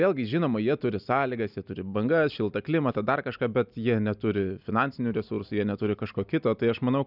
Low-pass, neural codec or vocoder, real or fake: 5.4 kHz; none; real